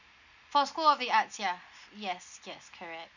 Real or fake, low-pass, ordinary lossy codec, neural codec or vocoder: real; 7.2 kHz; none; none